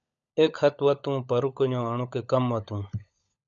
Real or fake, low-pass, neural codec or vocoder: fake; 7.2 kHz; codec, 16 kHz, 16 kbps, FunCodec, trained on LibriTTS, 50 frames a second